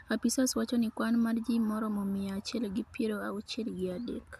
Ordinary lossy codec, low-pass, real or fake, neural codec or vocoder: AAC, 96 kbps; 14.4 kHz; real; none